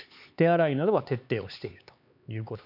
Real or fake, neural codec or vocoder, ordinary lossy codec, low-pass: fake; codec, 16 kHz, 4 kbps, X-Codec, HuBERT features, trained on LibriSpeech; none; 5.4 kHz